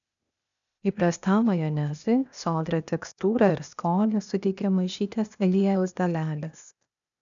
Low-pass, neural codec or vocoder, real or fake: 7.2 kHz; codec, 16 kHz, 0.8 kbps, ZipCodec; fake